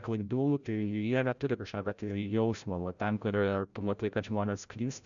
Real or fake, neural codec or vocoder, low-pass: fake; codec, 16 kHz, 0.5 kbps, FreqCodec, larger model; 7.2 kHz